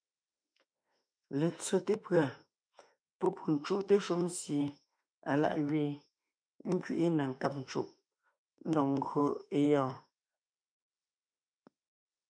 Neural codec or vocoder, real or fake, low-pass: autoencoder, 48 kHz, 32 numbers a frame, DAC-VAE, trained on Japanese speech; fake; 9.9 kHz